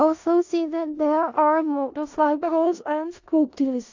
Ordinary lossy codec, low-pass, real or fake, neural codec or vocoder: AAC, 48 kbps; 7.2 kHz; fake; codec, 16 kHz in and 24 kHz out, 0.4 kbps, LongCat-Audio-Codec, four codebook decoder